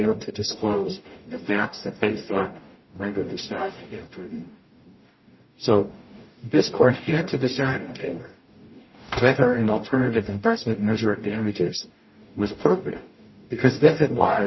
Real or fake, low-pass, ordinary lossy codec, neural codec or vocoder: fake; 7.2 kHz; MP3, 24 kbps; codec, 44.1 kHz, 0.9 kbps, DAC